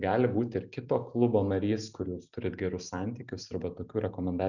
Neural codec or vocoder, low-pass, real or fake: none; 7.2 kHz; real